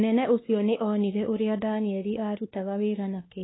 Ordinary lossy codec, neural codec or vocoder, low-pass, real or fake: AAC, 16 kbps; codec, 16 kHz, 2 kbps, X-Codec, WavLM features, trained on Multilingual LibriSpeech; 7.2 kHz; fake